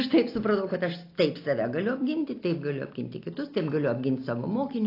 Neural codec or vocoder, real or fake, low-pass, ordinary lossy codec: none; real; 5.4 kHz; AAC, 32 kbps